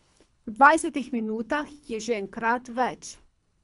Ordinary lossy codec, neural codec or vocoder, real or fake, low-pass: none; codec, 24 kHz, 3 kbps, HILCodec; fake; 10.8 kHz